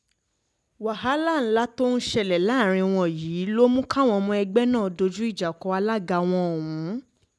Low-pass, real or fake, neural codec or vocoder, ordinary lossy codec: none; real; none; none